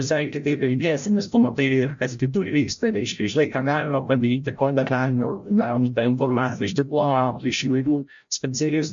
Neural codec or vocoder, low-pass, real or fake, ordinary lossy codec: codec, 16 kHz, 0.5 kbps, FreqCodec, larger model; 7.2 kHz; fake; MP3, 64 kbps